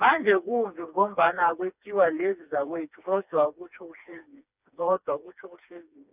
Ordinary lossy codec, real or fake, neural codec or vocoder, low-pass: none; fake; codec, 16 kHz, 2 kbps, FreqCodec, smaller model; 3.6 kHz